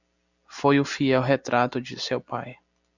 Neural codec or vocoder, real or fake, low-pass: none; real; 7.2 kHz